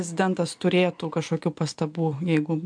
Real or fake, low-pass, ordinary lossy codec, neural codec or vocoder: real; 9.9 kHz; MP3, 64 kbps; none